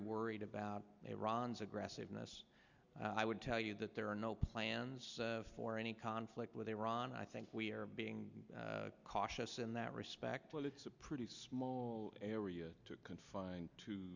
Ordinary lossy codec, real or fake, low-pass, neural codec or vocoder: Opus, 64 kbps; real; 7.2 kHz; none